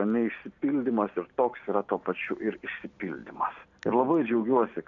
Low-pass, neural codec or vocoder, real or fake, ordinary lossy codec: 7.2 kHz; none; real; MP3, 96 kbps